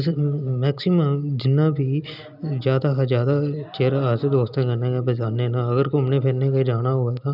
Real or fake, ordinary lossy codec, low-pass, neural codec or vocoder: real; none; 5.4 kHz; none